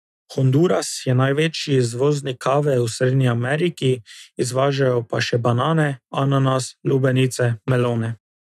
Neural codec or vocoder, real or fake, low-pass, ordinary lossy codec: none; real; none; none